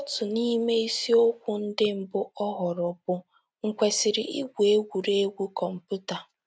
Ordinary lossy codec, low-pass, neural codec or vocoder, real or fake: none; none; none; real